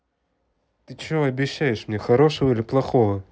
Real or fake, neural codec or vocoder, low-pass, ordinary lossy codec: real; none; none; none